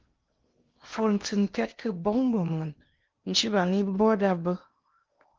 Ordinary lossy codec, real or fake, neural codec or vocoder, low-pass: Opus, 32 kbps; fake; codec, 16 kHz in and 24 kHz out, 0.8 kbps, FocalCodec, streaming, 65536 codes; 7.2 kHz